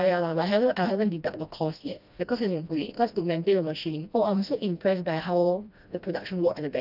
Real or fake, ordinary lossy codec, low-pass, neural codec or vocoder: fake; none; 5.4 kHz; codec, 16 kHz, 1 kbps, FreqCodec, smaller model